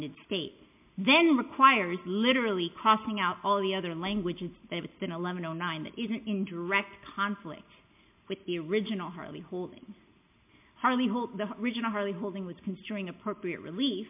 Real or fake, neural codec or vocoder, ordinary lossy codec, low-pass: real; none; MP3, 32 kbps; 3.6 kHz